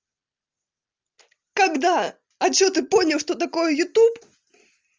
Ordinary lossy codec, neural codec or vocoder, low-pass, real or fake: Opus, 32 kbps; none; 7.2 kHz; real